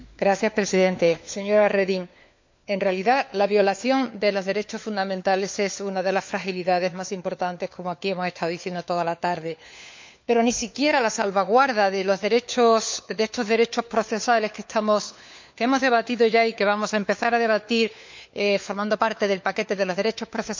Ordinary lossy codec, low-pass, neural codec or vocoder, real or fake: MP3, 64 kbps; 7.2 kHz; codec, 16 kHz, 4 kbps, FunCodec, trained on LibriTTS, 50 frames a second; fake